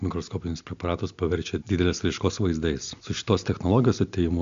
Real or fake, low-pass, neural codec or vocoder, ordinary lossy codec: real; 7.2 kHz; none; MP3, 64 kbps